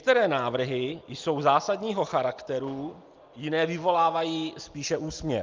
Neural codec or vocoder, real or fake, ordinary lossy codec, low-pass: none; real; Opus, 24 kbps; 7.2 kHz